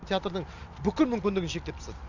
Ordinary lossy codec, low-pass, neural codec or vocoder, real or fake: none; 7.2 kHz; none; real